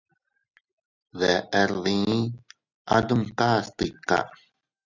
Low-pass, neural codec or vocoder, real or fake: 7.2 kHz; none; real